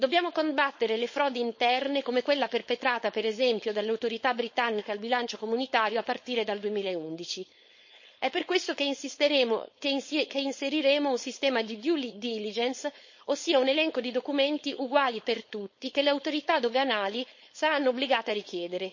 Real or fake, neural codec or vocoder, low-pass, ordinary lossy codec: fake; codec, 16 kHz, 4.8 kbps, FACodec; 7.2 kHz; MP3, 32 kbps